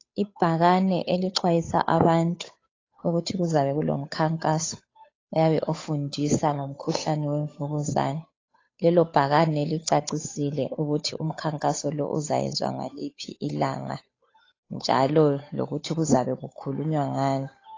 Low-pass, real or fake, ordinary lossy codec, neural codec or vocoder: 7.2 kHz; fake; AAC, 32 kbps; codec, 16 kHz, 8 kbps, FunCodec, trained on Chinese and English, 25 frames a second